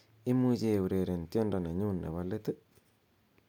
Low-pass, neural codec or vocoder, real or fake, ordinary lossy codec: 19.8 kHz; none; real; MP3, 96 kbps